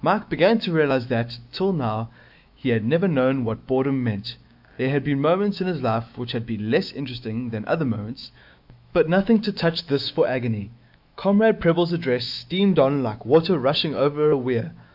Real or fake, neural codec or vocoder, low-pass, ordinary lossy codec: fake; autoencoder, 48 kHz, 128 numbers a frame, DAC-VAE, trained on Japanese speech; 5.4 kHz; AAC, 48 kbps